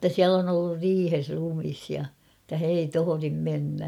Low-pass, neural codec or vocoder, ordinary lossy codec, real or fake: 19.8 kHz; none; none; real